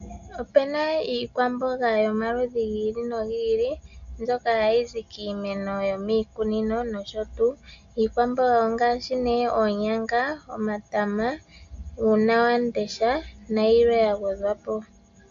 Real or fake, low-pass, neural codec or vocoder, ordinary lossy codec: real; 7.2 kHz; none; AAC, 96 kbps